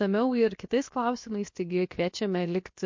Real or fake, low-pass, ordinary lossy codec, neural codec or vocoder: fake; 7.2 kHz; MP3, 48 kbps; codec, 16 kHz, about 1 kbps, DyCAST, with the encoder's durations